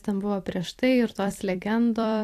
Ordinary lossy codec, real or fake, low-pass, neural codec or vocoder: AAC, 64 kbps; fake; 14.4 kHz; vocoder, 44.1 kHz, 128 mel bands every 256 samples, BigVGAN v2